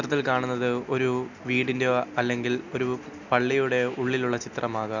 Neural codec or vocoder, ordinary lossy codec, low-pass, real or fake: none; none; 7.2 kHz; real